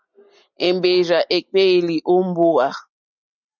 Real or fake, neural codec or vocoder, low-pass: real; none; 7.2 kHz